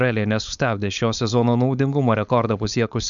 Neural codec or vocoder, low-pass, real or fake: codec, 16 kHz, 4.8 kbps, FACodec; 7.2 kHz; fake